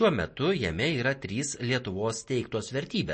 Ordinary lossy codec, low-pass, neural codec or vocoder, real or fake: MP3, 32 kbps; 9.9 kHz; none; real